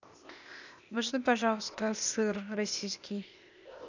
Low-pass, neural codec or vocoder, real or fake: 7.2 kHz; codec, 16 kHz, 0.8 kbps, ZipCodec; fake